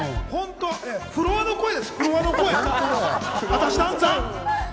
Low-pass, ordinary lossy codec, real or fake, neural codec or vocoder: none; none; real; none